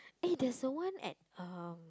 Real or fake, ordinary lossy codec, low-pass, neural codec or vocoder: real; none; none; none